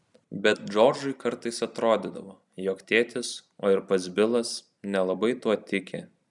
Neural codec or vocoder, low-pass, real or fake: none; 10.8 kHz; real